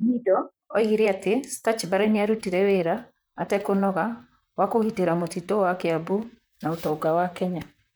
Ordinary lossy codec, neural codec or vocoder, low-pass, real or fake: none; vocoder, 44.1 kHz, 128 mel bands, Pupu-Vocoder; none; fake